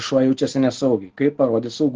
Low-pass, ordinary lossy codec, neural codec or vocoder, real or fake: 7.2 kHz; Opus, 16 kbps; none; real